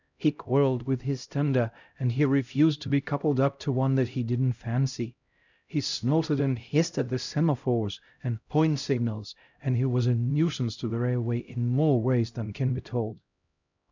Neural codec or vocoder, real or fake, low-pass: codec, 16 kHz, 0.5 kbps, X-Codec, HuBERT features, trained on LibriSpeech; fake; 7.2 kHz